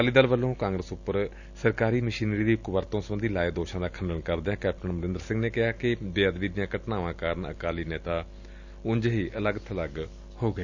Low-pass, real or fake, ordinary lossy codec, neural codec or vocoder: 7.2 kHz; real; none; none